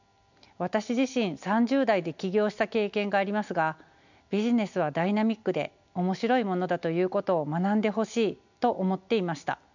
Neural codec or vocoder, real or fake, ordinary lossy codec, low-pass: none; real; none; 7.2 kHz